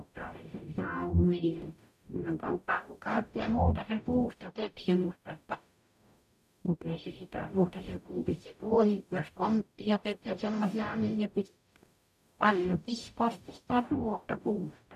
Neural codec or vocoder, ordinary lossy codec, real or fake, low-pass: codec, 44.1 kHz, 0.9 kbps, DAC; none; fake; 14.4 kHz